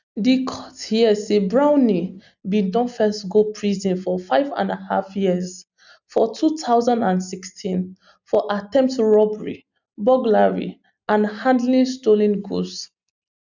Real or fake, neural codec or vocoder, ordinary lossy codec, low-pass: real; none; none; 7.2 kHz